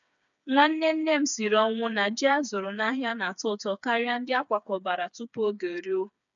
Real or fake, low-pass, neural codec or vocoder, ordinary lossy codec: fake; 7.2 kHz; codec, 16 kHz, 4 kbps, FreqCodec, smaller model; none